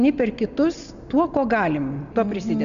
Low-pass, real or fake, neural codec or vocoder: 7.2 kHz; real; none